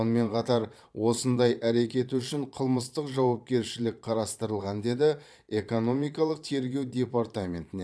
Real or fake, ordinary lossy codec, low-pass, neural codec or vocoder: fake; none; none; vocoder, 22.05 kHz, 80 mel bands, Vocos